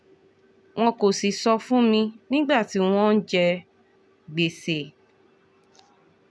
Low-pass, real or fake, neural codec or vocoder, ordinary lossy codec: none; real; none; none